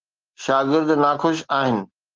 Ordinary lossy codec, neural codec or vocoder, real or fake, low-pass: Opus, 16 kbps; none; real; 7.2 kHz